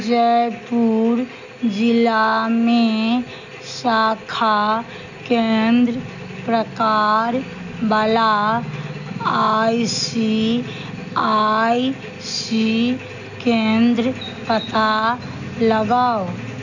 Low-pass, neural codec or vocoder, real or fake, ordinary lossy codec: 7.2 kHz; none; real; none